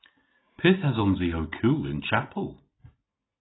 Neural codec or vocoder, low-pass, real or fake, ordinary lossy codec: none; 7.2 kHz; real; AAC, 16 kbps